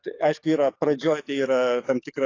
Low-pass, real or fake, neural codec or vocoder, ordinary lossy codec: 7.2 kHz; fake; codec, 44.1 kHz, 7.8 kbps, DAC; AAC, 32 kbps